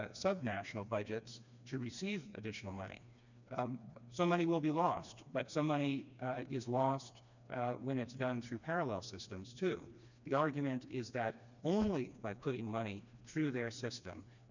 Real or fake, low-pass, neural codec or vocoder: fake; 7.2 kHz; codec, 16 kHz, 2 kbps, FreqCodec, smaller model